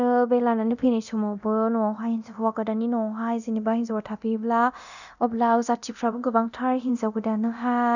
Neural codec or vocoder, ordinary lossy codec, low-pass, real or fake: codec, 24 kHz, 0.9 kbps, DualCodec; none; 7.2 kHz; fake